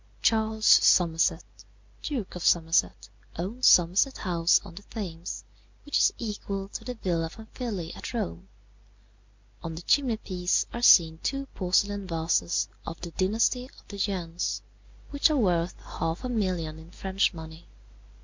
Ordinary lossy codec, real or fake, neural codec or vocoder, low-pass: MP3, 64 kbps; real; none; 7.2 kHz